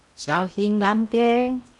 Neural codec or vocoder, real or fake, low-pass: codec, 16 kHz in and 24 kHz out, 0.8 kbps, FocalCodec, streaming, 65536 codes; fake; 10.8 kHz